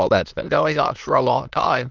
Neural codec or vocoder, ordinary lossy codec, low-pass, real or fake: autoencoder, 22.05 kHz, a latent of 192 numbers a frame, VITS, trained on many speakers; Opus, 24 kbps; 7.2 kHz; fake